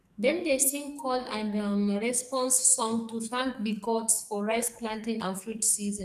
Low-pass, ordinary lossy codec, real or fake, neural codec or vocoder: 14.4 kHz; none; fake; codec, 44.1 kHz, 2.6 kbps, SNAC